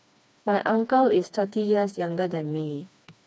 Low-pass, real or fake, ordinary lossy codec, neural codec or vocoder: none; fake; none; codec, 16 kHz, 2 kbps, FreqCodec, smaller model